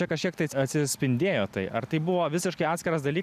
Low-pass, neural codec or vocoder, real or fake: 14.4 kHz; none; real